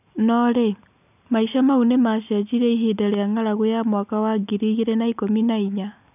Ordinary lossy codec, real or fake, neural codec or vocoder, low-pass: none; real; none; 3.6 kHz